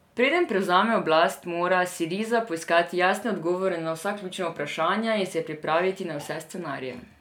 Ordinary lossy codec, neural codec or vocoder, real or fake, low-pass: none; none; real; 19.8 kHz